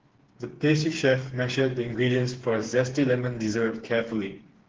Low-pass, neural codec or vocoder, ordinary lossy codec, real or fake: 7.2 kHz; codec, 16 kHz, 4 kbps, FreqCodec, smaller model; Opus, 16 kbps; fake